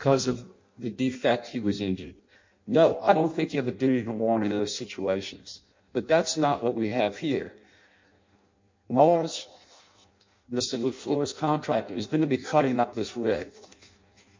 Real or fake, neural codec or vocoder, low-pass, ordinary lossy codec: fake; codec, 16 kHz in and 24 kHz out, 0.6 kbps, FireRedTTS-2 codec; 7.2 kHz; MP3, 48 kbps